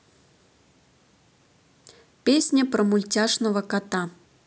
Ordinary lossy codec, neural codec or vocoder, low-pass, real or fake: none; none; none; real